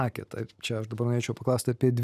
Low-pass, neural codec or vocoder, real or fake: 14.4 kHz; none; real